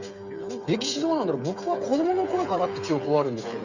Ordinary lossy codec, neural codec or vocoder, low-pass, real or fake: Opus, 64 kbps; codec, 16 kHz, 8 kbps, FreqCodec, smaller model; 7.2 kHz; fake